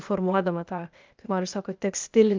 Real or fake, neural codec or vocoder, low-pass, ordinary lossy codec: fake; codec, 16 kHz, 0.8 kbps, ZipCodec; 7.2 kHz; Opus, 24 kbps